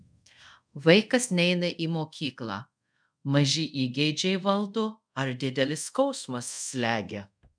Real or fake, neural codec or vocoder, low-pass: fake; codec, 24 kHz, 0.5 kbps, DualCodec; 9.9 kHz